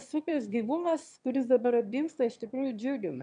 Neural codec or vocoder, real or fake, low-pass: autoencoder, 22.05 kHz, a latent of 192 numbers a frame, VITS, trained on one speaker; fake; 9.9 kHz